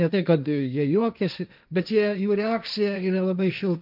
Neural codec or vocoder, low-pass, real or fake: codec, 16 kHz, 1.1 kbps, Voila-Tokenizer; 5.4 kHz; fake